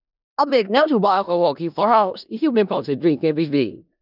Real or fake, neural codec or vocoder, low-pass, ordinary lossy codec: fake; codec, 16 kHz in and 24 kHz out, 0.4 kbps, LongCat-Audio-Codec, four codebook decoder; 5.4 kHz; none